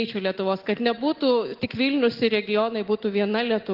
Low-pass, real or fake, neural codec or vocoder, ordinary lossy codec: 5.4 kHz; real; none; Opus, 16 kbps